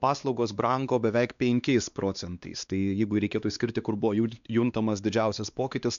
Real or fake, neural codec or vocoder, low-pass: fake; codec, 16 kHz, 2 kbps, X-Codec, WavLM features, trained on Multilingual LibriSpeech; 7.2 kHz